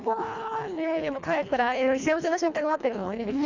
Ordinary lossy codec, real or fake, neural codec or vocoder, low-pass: none; fake; codec, 24 kHz, 1.5 kbps, HILCodec; 7.2 kHz